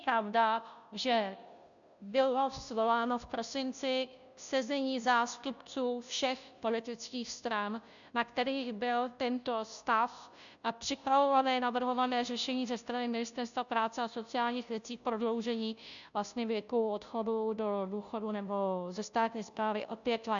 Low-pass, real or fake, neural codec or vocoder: 7.2 kHz; fake; codec, 16 kHz, 0.5 kbps, FunCodec, trained on Chinese and English, 25 frames a second